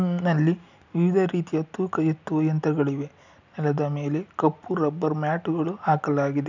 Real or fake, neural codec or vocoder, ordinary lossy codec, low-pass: real; none; none; 7.2 kHz